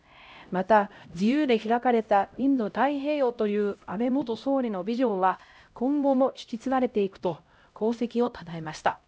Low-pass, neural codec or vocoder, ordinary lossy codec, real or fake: none; codec, 16 kHz, 0.5 kbps, X-Codec, HuBERT features, trained on LibriSpeech; none; fake